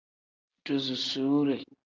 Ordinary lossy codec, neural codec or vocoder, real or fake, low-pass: Opus, 32 kbps; none; real; 7.2 kHz